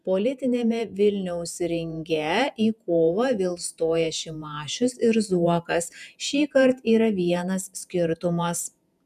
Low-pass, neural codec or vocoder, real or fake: 14.4 kHz; vocoder, 48 kHz, 128 mel bands, Vocos; fake